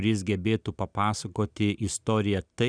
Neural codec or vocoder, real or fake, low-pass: none; real; 9.9 kHz